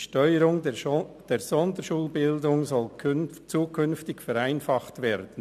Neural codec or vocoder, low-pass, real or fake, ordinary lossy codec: none; 14.4 kHz; real; MP3, 96 kbps